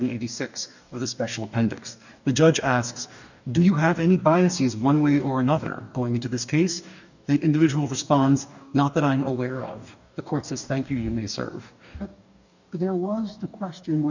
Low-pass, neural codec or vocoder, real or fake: 7.2 kHz; codec, 44.1 kHz, 2.6 kbps, DAC; fake